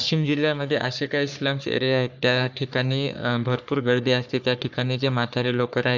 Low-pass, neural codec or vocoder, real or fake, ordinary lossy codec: 7.2 kHz; codec, 44.1 kHz, 3.4 kbps, Pupu-Codec; fake; none